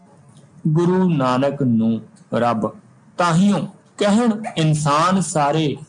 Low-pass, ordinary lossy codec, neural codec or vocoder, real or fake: 9.9 kHz; MP3, 96 kbps; none; real